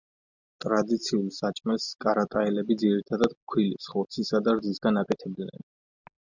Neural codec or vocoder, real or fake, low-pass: none; real; 7.2 kHz